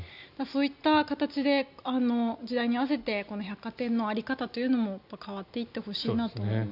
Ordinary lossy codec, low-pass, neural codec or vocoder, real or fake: none; 5.4 kHz; none; real